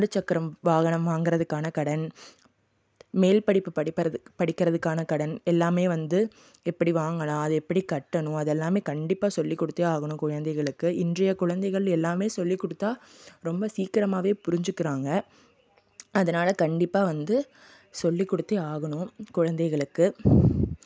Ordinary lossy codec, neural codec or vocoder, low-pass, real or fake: none; none; none; real